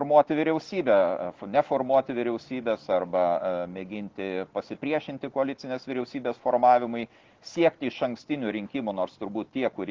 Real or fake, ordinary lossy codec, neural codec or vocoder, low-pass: real; Opus, 16 kbps; none; 7.2 kHz